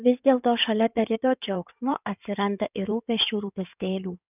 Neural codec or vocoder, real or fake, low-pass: codec, 16 kHz in and 24 kHz out, 2.2 kbps, FireRedTTS-2 codec; fake; 3.6 kHz